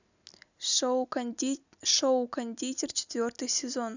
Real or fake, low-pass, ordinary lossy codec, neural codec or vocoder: real; 7.2 kHz; none; none